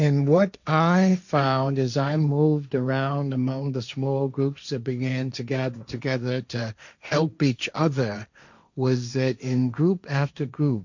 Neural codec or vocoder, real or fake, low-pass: codec, 16 kHz, 1.1 kbps, Voila-Tokenizer; fake; 7.2 kHz